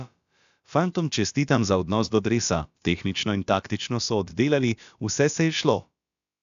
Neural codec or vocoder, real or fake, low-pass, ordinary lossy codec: codec, 16 kHz, about 1 kbps, DyCAST, with the encoder's durations; fake; 7.2 kHz; AAC, 96 kbps